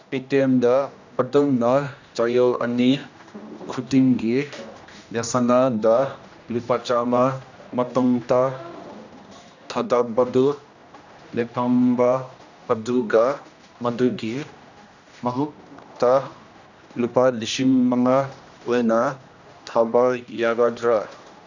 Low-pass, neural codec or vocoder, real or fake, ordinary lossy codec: 7.2 kHz; codec, 16 kHz, 1 kbps, X-Codec, HuBERT features, trained on general audio; fake; none